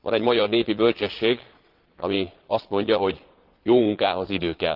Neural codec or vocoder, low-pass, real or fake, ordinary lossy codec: vocoder, 22.05 kHz, 80 mel bands, Vocos; 5.4 kHz; fake; Opus, 16 kbps